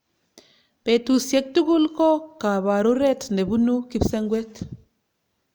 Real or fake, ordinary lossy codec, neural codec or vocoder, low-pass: real; none; none; none